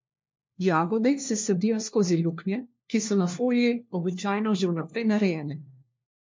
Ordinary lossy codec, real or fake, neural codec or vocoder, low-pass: MP3, 48 kbps; fake; codec, 16 kHz, 1 kbps, FunCodec, trained on LibriTTS, 50 frames a second; 7.2 kHz